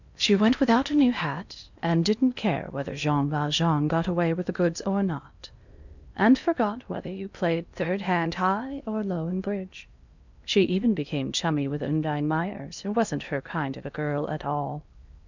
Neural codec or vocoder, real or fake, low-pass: codec, 16 kHz in and 24 kHz out, 0.8 kbps, FocalCodec, streaming, 65536 codes; fake; 7.2 kHz